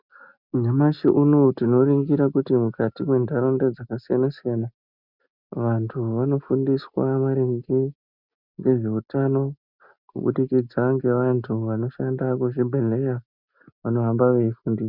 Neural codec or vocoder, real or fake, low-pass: none; real; 5.4 kHz